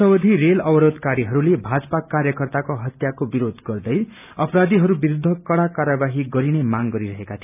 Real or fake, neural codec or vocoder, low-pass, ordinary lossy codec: real; none; 3.6 kHz; none